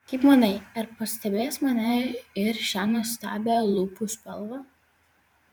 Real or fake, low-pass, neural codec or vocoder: fake; 19.8 kHz; vocoder, 44.1 kHz, 128 mel bands every 512 samples, BigVGAN v2